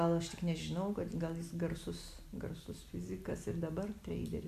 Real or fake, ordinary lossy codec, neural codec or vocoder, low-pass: real; MP3, 96 kbps; none; 14.4 kHz